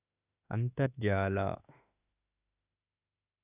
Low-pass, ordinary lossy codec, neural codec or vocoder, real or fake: 3.6 kHz; none; autoencoder, 48 kHz, 32 numbers a frame, DAC-VAE, trained on Japanese speech; fake